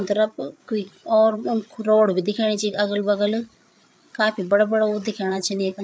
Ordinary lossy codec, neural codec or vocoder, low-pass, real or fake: none; codec, 16 kHz, 8 kbps, FreqCodec, larger model; none; fake